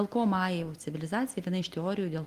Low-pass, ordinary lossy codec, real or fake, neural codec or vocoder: 14.4 kHz; Opus, 24 kbps; real; none